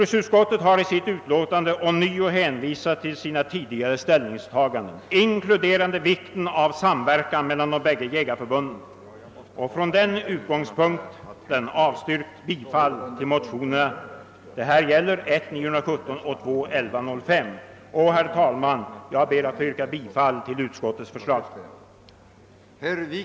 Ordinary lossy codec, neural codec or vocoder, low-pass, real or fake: none; none; none; real